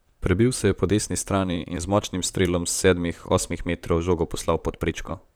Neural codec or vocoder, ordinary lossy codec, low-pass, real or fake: vocoder, 44.1 kHz, 128 mel bands, Pupu-Vocoder; none; none; fake